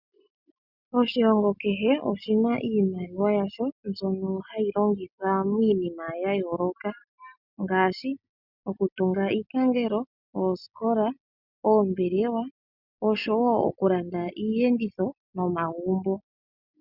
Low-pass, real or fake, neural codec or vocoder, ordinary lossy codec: 5.4 kHz; real; none; Opus, 64 kbps